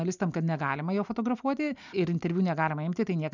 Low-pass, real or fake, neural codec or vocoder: 7.2 kHz; real; none